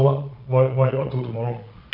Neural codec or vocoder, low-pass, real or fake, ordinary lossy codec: vocoder, 22.05 kHz, 80 mel bands, Vocos; 5.4 kHz; fake; none